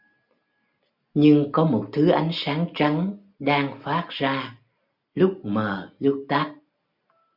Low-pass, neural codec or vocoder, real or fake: 5.4 kHz; none; real